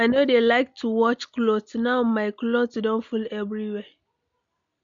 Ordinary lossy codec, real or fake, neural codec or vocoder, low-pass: MP3, 64 kbps; real; none; 7.2 kHz